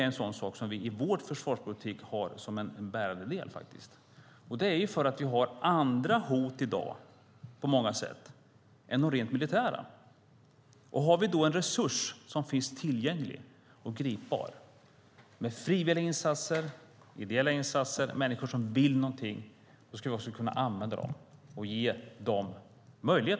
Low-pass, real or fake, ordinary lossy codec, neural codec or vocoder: none; real; none; none